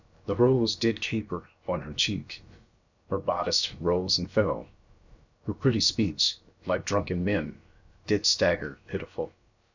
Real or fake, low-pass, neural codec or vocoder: fake; 7.2 kHz; codec, 16 kHz, about 1 kbps, DyCAST, with the encoder's durations